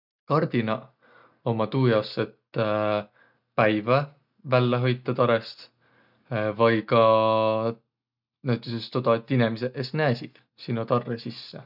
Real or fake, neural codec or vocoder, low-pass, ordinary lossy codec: real; none; 5.4 kHz; none